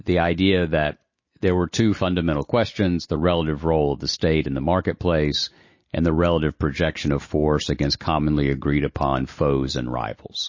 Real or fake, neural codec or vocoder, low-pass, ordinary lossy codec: real; none; 7.2 kHz; MP3, 32 kbps